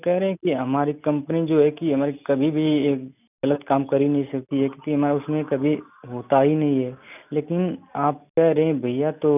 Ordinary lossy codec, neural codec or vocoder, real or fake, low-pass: none; none; real; 3.6 kHz